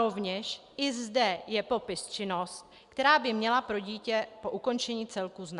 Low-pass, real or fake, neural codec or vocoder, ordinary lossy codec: 10.8 kHz; real; none; Opus, 64 kbps